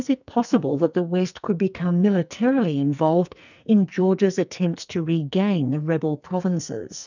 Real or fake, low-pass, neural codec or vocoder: fake; 7.2 kHz; codec, 44.1 kHz, 2.6 kbps, SNAC